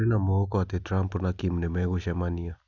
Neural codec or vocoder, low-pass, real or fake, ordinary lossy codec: none; 7.2 kHz; real; none